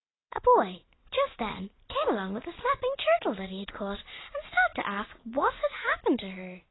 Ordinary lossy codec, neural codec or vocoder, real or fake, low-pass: AAC, 16 kbps; none; real; 7.2 kHz